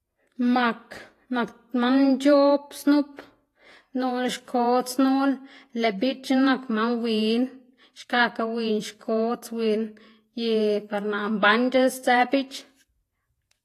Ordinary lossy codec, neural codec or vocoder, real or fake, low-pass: AAC, 48 kbps; vocoder, 48 kHz, 128 mel bands, Vocos; fake; 14.4 kHz